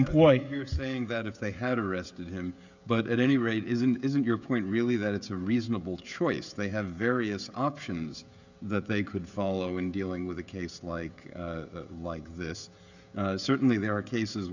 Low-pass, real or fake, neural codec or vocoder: 7.2 kHz; fake; codec, 16 kHz, 16 kbps, FreqCodec, smaller model